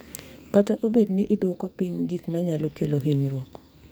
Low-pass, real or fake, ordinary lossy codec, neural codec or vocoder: none; fake; none; codec, 44.1 kHz, 2.6 kbps, SNAC